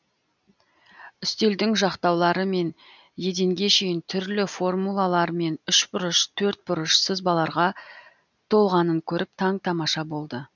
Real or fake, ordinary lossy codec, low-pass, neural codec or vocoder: real; none; 7.2 kHz; none